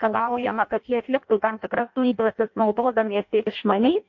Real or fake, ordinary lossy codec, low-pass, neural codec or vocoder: fake; MP3, 48 kbps; 7.2 kHz; codec, 16 kHz in and 24 kHz out, 0.6 kbps, FireRedTTS-2 codec